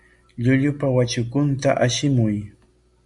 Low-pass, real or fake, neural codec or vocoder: 10.8 kHz; real; none